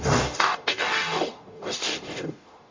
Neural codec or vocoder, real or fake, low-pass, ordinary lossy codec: codec, 44.1 kHz, 0.9 kbps, DAC; fake; 7.2 kHz; MP3, 48 kbps